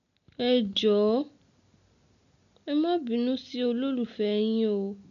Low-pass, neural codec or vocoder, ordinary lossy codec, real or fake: 7.2 kHz; none; none; real